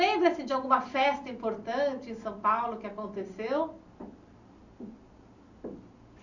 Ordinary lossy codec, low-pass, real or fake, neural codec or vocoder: none; 7.2 kHz; real; none